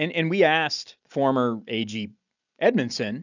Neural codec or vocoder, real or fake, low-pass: none; real; 7.2 kHz